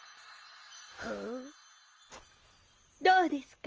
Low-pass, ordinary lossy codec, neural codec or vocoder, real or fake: 7.2 kHz; Opus, 24 kbps; none; real